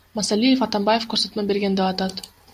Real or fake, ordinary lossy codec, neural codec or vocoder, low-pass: real; AAC, 96 kbps; none; 14.4 kHz